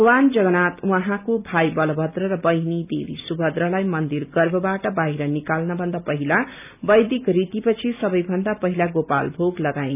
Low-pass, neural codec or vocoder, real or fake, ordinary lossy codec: 3.6 kHz; none; real; none